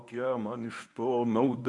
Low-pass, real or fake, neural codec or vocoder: 10.8 kHz; fake; codec, 24 kHz, 0.9 kbps, WavTokenizer, medium speech release version 1